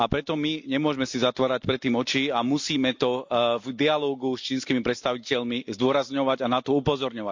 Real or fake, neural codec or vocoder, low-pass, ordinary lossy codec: real; none; 7.2 kHz; MP3, 64 kbps